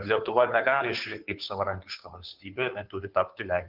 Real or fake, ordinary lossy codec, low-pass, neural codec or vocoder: fake; Opus, 32 kbps; 5.4 kHz; codec, 16 kHz, 2 kbps, FunCodec, trained on Chinese and English, 25 frames a second